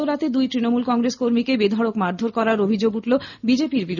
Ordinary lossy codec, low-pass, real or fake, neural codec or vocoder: none; 7.2 kHz; real; none